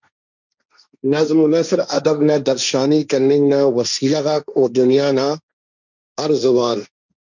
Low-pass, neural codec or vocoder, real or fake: 7.2 kHz; codec, 16 kHz, 1.1 kbps, Voila-Tokenizer; fake